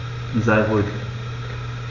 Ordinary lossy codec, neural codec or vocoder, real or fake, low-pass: none; none; real; 7.2 kHz